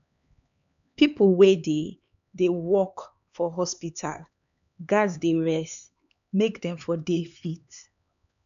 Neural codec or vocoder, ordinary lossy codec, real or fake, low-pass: codec, 16 kHz, 4 kbps, X-Codec, HuBERT features, trained on LibriSpeech; none; fake; 7.2 kHz